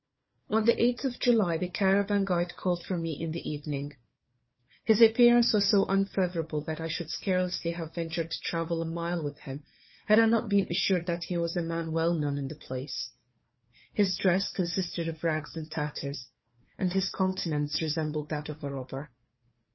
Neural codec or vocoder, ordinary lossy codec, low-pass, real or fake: codec, 16 kHz, 4 kbps, FunCodec, trained on Chinese and English, 50 frames a second; MP3, 24 kbps; 7.2 kHz; fake